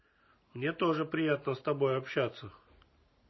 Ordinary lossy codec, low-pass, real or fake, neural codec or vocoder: MP3, 24 kbps; 7.2 kHz; real; none